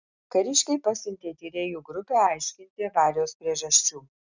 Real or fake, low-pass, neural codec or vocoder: real; 7.2 kHz; none